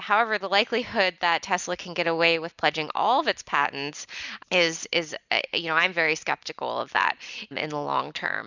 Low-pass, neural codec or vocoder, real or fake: 7.2 kHz; none; real